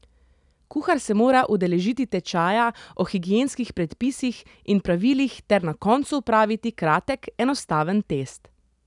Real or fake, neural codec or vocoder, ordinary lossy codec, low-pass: real; none; none; 10.8 kHz